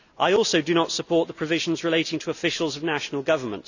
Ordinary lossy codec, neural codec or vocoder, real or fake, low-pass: none; none; real; 7.2 kHz